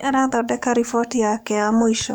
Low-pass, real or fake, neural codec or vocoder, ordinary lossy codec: 19.8 kHz; fake; codec, 44.1 kHz, 7.8 kbps, DAC; none